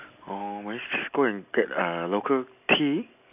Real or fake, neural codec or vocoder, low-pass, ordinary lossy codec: real; none; 3.6 kHz; none